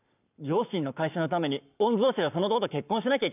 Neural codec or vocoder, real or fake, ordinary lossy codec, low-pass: codec, 44.1 kHz, 7.8 kbps, Pupu-Codec; fake; none; 3.6 kHz